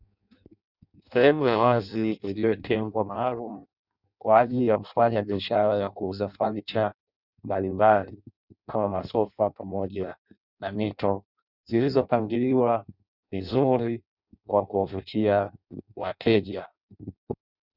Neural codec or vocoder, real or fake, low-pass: codec, 16 kHz in and 24 kHz out, 0.6 kbps, FireRedTTS-2 codec; fake; 5.4 kHz